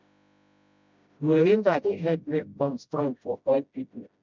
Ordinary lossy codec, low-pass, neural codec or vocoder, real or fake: MP3, 64 kbps; 7.2 kHz; codec, 16 kHz, 0.5 kbps, FreqCodec, smaller model; fake